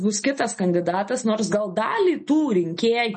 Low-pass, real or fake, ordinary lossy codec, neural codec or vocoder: 10.8 kHz; real; MP3, 32 kbps; none